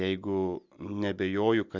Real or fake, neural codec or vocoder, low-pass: real; none; 7.2 kHz